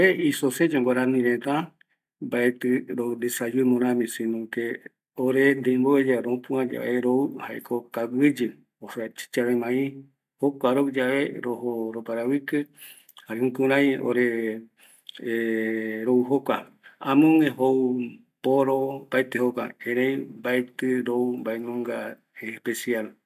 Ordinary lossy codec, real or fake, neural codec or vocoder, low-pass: none; real; none; 14.4 kHz